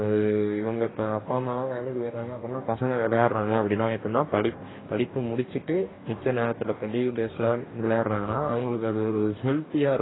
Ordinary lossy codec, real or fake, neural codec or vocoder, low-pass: AAC, 16 kbps; fake; codec, 44.1 kHz, 2.6 kbps, DAC; 7.2 kHz